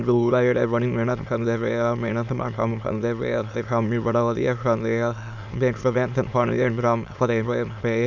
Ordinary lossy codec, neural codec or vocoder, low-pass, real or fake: MP3, 64 kbps; autoencoder, 22.05 kHz, a latent of 192 numbers a frame, VITS, trained on many speakers; 7.2 kHz; fake